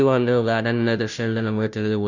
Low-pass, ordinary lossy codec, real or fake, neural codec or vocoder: 7.2 kHz; none; fake; codec, 16 kHz, 0.5 kbps, FunCodec, trained on Chinese and English, 25 frames a second